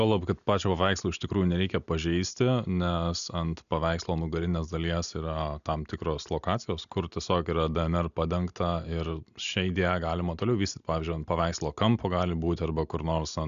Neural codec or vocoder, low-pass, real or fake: none; 7.2 kHz; real